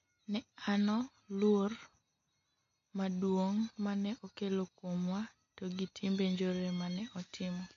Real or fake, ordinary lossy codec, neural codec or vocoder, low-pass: real; MP3, 48 kbps; none; 7.2 kHz